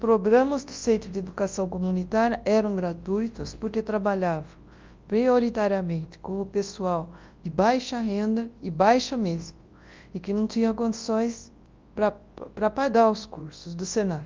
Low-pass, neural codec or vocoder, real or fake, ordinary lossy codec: 7.2 kHz; codec, 24 kHz, 0.9 kbps, WavTokenizer, large speech release; fake; Opus, 24 kbps